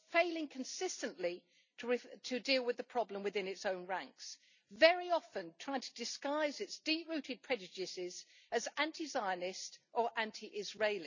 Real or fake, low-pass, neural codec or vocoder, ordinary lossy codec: real; 7.2 kHz; none; none